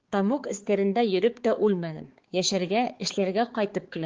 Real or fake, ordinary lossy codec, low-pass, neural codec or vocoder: fake; Opus, 16 kbps; 7.2 kHz; codec, 16 kHz, 4 kbps, X-Codec, HuBERT features, trained on balanced general audio